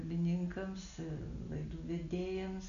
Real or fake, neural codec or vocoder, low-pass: real; none; 7.2 kHz